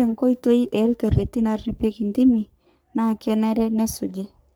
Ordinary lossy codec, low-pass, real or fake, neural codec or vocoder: none; none; fake; codec, 44.1 kHz, 3.4 kbps, Pupu-Codec